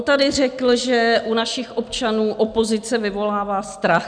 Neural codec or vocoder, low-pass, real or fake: none; 9.9 kHz; real